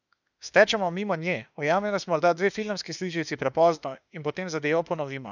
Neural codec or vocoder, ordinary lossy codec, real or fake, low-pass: autoencoder, 48 kHz, 32 numbers a frame, DAC-VAE, trained on Japanese speech; none; fake; 7.2 kHz